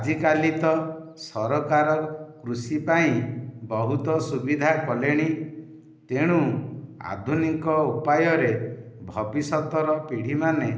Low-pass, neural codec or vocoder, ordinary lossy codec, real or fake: none; none; none; real